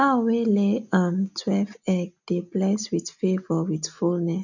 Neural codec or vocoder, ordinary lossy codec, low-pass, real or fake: none; none; 7.2 kHz; real